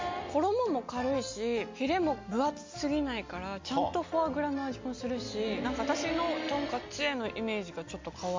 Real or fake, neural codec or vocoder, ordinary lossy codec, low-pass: real; none; MP3, 64 kbps; 7.2 kHz